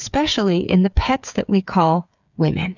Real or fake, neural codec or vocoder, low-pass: fake; codec, 16 kHz, 8 kbps, FreqCodec, smaller model; 7.2 kHz